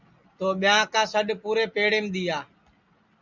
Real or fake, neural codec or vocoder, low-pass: real; none; 7.2 kHz